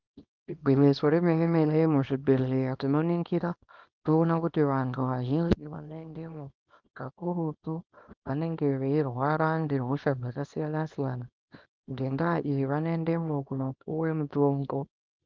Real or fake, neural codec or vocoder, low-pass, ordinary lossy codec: fake; codec, 24 kHz, 0.9 kbps, WavTokenizer, small release; 7.2 kHz; Opus, 32 kbps